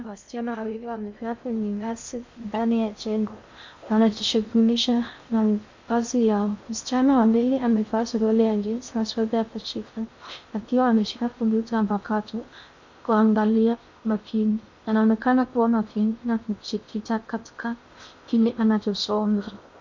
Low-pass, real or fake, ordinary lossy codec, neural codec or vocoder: 7.2 kHz; fake; MP3, 64 kbps; codec, 16 kHz in and 24 kHz out, 0.6 kbps, FocalCodec, streaming, 2048 codes